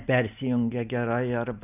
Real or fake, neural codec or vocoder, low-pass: real; none; 3.6 kHz